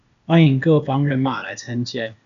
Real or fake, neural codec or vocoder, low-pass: fake; codec, 16 kHz, 0.8 kbps, ZipCodec; 7.2 kHz